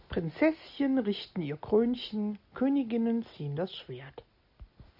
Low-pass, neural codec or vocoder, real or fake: 5.4 kHz; none; real